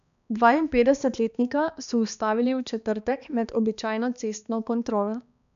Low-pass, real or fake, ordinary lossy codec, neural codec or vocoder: 7.2 kHz; fake; none; codec, 16 kHz, 2 kbps, X-Codec, HuBERT features, trained on balanced general audio